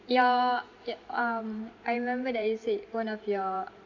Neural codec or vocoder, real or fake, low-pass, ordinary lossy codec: vocoder, 44.1 kHz, 128 mel bands every 512 samples, BigVGAN v2; fake; 7.2 kHz; AAC, 48 kbps